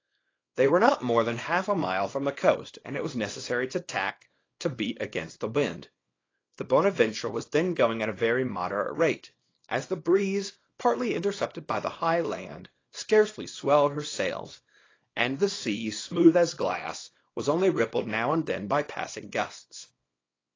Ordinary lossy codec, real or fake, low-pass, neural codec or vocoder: AAC, 32 kbps; fake; 7.2 kHz; codec, 24 kHz, 0.9 kbps, WavTokenizer, small release